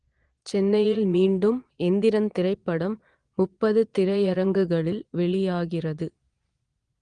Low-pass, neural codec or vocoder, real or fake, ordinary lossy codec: 9.9 kHz; vocoder, 22.05 kHz, 80 mel bands, Vocos; fake; Opus, 24 kbps